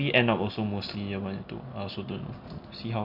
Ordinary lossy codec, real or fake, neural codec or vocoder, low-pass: none; real; none; 5.4 kHz